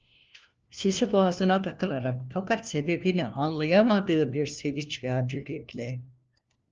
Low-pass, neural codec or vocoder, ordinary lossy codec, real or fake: 7.2 kHz; codec, 16 kHz, 1 kbps, FunCodec, trained on LibriTTS, 50 frames a second; Opus, 24 kbps; fake